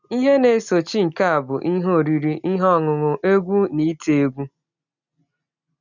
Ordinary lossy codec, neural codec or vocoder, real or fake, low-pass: none; none; real; 7.2 kHz